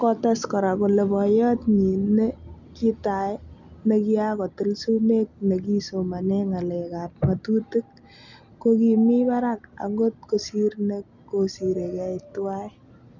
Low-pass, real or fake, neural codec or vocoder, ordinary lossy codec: 7.2 kHz; real; none; none